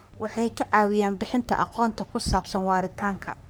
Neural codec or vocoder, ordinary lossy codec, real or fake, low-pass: codec, 44.1 kHz, 3.4 kbps, Pupu-Codec; none; fake; none